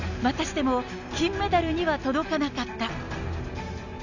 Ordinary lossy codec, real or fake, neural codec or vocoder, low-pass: none; real; none; 7.2 kHz